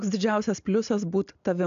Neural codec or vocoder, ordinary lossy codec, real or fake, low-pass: none; AAC, 96 kbps; real; 7.2 kHz